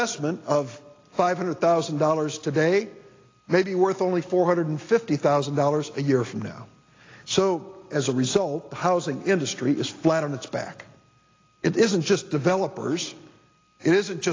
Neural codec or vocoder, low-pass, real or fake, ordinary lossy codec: none; 7.2 kHz; real; AAC, 32 kbps